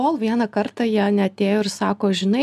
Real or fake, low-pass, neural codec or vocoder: real; 14.4 kHz; none